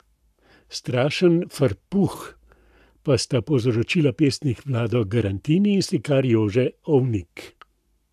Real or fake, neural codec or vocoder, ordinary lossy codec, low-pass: fake; codec, 44.1 kHz, 7.8 kbps, Pupu-Codec; AAC, 96 kbps; 14.4 kHz